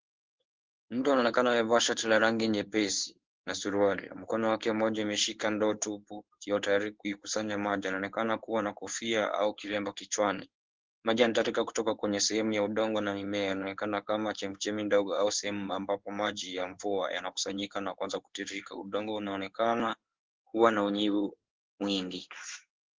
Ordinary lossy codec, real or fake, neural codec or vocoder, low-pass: Opus, 16 kbps; fake; codec, 16 kHz in and 24 kHz out, 1 kbps, XY-Tokenizer; 7.2 kHz